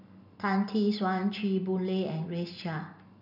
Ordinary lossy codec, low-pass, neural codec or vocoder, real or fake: none; 5.4 kHz; none; real